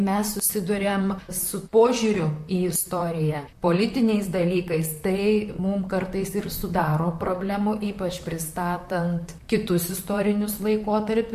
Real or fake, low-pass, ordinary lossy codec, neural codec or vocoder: fake; 14.4 kHz; MP3, 64 kbps; vocoder, 44.1 kHz, 128 mel bands, Pupu-Vocoder